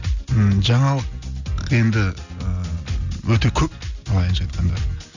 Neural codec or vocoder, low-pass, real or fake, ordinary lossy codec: none; 7.2 kHz; real; none